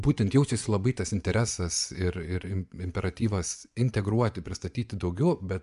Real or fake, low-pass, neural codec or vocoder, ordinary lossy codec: fake; 10.8 kHz; vocoder, 24 kHz, 100 mel bands, Vocos; MP3, 96 kbps